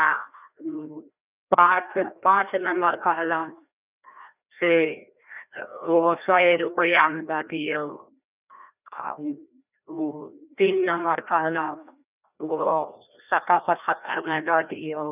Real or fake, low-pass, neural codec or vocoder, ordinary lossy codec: fake; 3.6 kHz; codec, 16 kHz, 1 kbps, FreqCodec, larger model; none